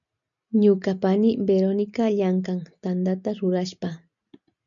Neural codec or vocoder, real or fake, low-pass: none; real; 7.2 kHz